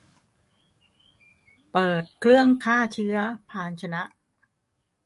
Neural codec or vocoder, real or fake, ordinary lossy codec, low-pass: codec, 44.1 kHz, 7.8 kbps, DAC; fake; MP3, 48 kbps; 14.4 kHz